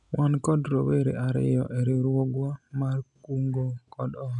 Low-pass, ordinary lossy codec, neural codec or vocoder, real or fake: 10.8 kHz; none; none; real